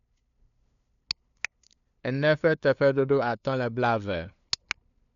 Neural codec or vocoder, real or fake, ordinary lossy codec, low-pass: codec, 16 kHz, 2 kbps, FunCodec, trained on LibriTTS, 25 frames a second; fake; none; 7.2 kHz